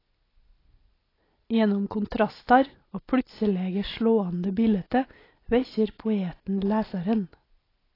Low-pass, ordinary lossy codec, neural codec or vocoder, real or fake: 5.4 kHz; AAC, 24 kbps; none; real